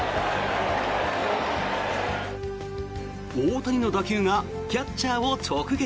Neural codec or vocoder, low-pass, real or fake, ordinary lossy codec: none; none; real; none